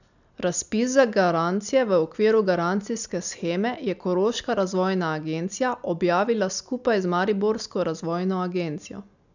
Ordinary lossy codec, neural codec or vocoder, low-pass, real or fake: none; none; 7.2 kHz; real